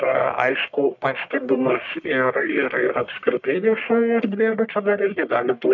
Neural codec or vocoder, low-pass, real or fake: codec, 44.1 kHz, 1.7 kbps, Pupu-Codec; 7.2 kHz; fake